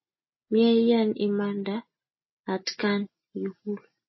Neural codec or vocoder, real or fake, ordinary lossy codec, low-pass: none; real; MP3, 24 kbps; 7.2 kHz